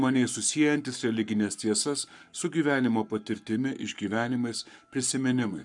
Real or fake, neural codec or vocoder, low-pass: fake; codec, 44.1 kHz, 7.8 kbps, Pupu-Codec; 10.8 kHz